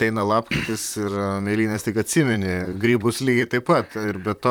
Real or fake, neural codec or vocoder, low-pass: fake; vocoder, 44.1 kHz, 128 mel bands, Pupu-Vocoder; 19.8 kHz